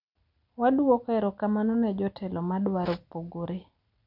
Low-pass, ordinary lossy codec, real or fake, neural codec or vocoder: 5.4 kHz; none; real; none